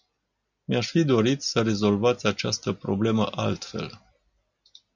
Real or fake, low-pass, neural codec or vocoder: real; 7.2 kHz; none